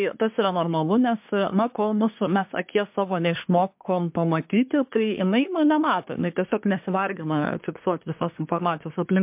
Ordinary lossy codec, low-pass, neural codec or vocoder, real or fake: MP3, 32 kbps; 3.6 kHz; codec, 24 kHz, 1 kbps, SNAC; fake